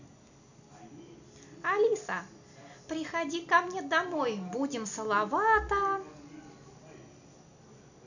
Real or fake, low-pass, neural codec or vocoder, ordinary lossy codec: real; 7.2 kHz; none; none